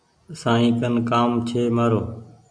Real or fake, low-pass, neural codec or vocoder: real; 9.9 kHz; none